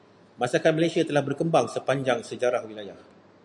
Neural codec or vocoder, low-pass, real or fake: none; 10.8 kHz; real